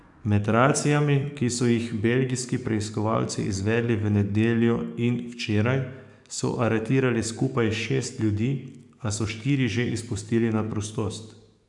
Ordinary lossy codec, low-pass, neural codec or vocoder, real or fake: none; 10.8 kHz; codec, 44.1 kHz, 7.8 kbps, DAC; fake